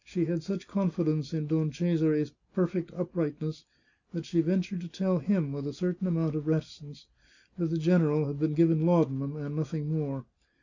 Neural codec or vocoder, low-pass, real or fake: none; 7.2 kHz; real